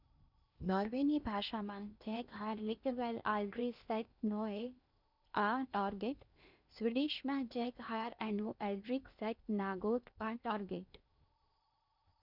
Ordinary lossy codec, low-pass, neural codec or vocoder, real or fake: none; 5.4 kHz; codec, 16 kHz in and 24 kHz out, 0.8 kbps, FocalCodec, streaming, 65536 codes; fake